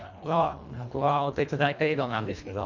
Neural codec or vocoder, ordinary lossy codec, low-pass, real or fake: codec, 24 kHz, 1.5 kbps, HILCodec; MP3, 64 kbps; 7.2 kHz; fake